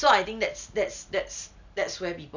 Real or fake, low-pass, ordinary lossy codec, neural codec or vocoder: real; 7.2 kHz; none; none